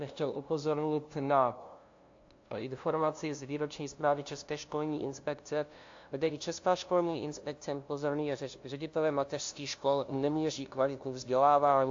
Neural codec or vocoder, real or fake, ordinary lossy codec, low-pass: codec, 16 kHz, 0.5 kbps, FunCodec, trained on LibriTTS, 25 frames a second; fake; AAC, 48 kbps; 7.2 kHz